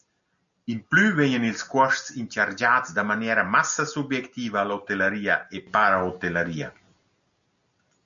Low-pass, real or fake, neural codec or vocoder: 7.2 kHz; real; none